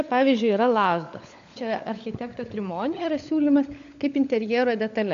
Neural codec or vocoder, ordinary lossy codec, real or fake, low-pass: codec, 16 kHz, 16 kbps, FunCodec, trained on LibriTTS, 50 frames a second; AAC, 64 kbps; fake; 7.2 kHz